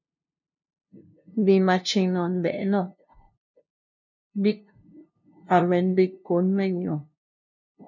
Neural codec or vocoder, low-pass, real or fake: codec, 16 kHz, 0.5 kbps, FunCodec, trained on LibriTTS, 25 frames a second; 7.2 kHz; fake